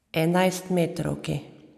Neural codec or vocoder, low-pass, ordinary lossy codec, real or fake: none; 14.4 kHz; none; real